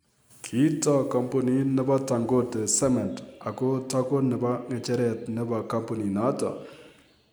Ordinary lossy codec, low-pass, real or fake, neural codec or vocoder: none; none; real; none